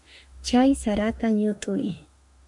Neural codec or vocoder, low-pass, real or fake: autoencoder, 48 kHz, 32 numbers a frame, DAC-VAE, trained on Japanese speech; 10.8 kHz; fake